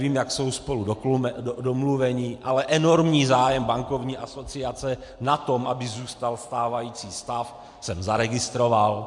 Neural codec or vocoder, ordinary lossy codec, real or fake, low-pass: none; MP3, 64 kbps; real; 10.8 kHz